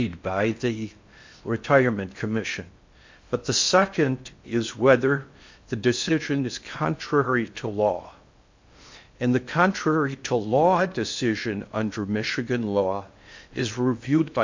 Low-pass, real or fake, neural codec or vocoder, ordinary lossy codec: 7.2 kHz; fake; codec, 16 kHz in and 24 kHz out, 0.6 kbps, FocalCodec, streaming, 4096 codes; MP3, 48 kbps